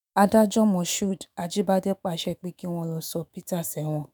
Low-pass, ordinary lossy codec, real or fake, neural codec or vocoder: none; none; fake; autoencoder, 48 kHz, 128 numbers a frame, DAC-VAE, trained on Japanese speech